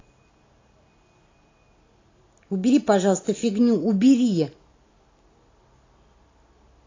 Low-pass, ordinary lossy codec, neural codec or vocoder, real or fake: 7.2 kHz; AAC, 32 kbps; none; real